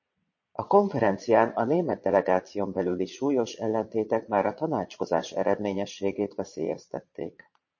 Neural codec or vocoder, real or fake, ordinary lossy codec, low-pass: vocoder, 22.05 kHz, 80 mel bands, Vocos; fake; MP3, 32 kbps; 7.2 kHz